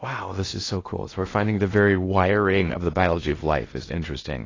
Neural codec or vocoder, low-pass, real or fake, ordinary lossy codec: codec, 16 kHz in and 24 kHz out, 0.6 kbps, FocalCodec, streaming, 2048 codes; 7.2 kHz; fake; AAC, 32 kbps